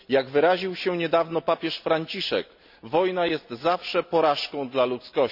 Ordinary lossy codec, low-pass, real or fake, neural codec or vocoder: none; 5.4 kHz; real; none